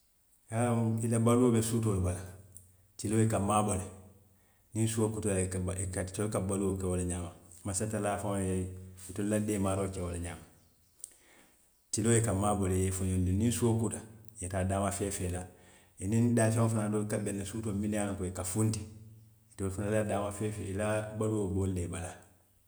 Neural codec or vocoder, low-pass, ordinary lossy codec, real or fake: none; none; none; real